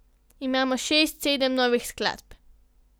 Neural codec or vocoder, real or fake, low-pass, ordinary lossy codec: none; real; none; none